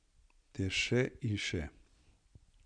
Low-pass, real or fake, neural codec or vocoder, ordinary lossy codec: 9.9 kHz; real; none; none